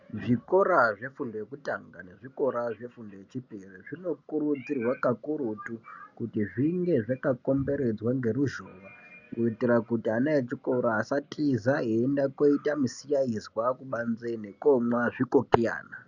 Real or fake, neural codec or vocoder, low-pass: real; none; 7.2 kHz